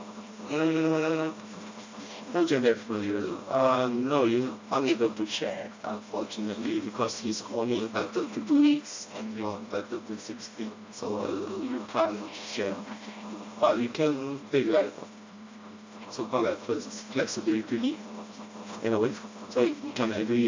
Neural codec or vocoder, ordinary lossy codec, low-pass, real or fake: codec, 16 kHz, 1 kbps, FreqCodec, smaller model; MP3, 48 kbps; 7.2 kHz; fake